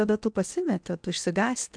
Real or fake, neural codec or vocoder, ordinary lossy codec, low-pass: fake; codec, 16 kHz in and 24 kHz out, 0.8 kbps, FocalCodec, streaming, 65536 codes; MP3, 96 kbps; 9.9 kHz